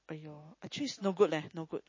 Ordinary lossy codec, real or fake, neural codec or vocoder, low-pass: MP3, 32 kbps; real; none; 7.2 kHz